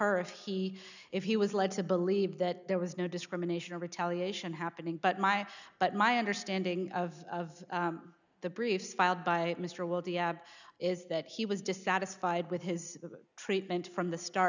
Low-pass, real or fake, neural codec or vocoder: 7.2 kHz; real; none